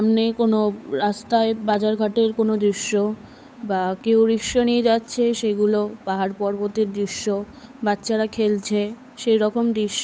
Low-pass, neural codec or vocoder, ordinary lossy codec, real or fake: none; codec, 16 kHz, 8 kbps, FunCodec, trained on Chinese and English, 25 frames a second; none; fake